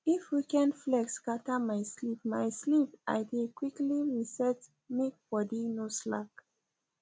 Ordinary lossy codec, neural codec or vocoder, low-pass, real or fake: none; none; none; real